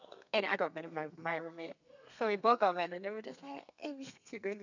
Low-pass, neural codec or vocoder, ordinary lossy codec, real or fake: 7.2 kHz; codec, 32 kHz, 1.9 kbps, SNAC; none; fake